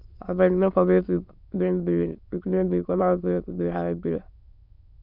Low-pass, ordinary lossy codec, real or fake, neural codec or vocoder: 5.4 kHz; none; fake; autoencoder, 22.05 kHz, a latent of 192 numbers a frame, VITS, trained on many speakers